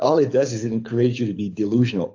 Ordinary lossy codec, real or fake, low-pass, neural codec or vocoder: AAC, 48 kbps; fake; 7.2 kHz; codec, 24 kHz, 6 kbps, HILCodec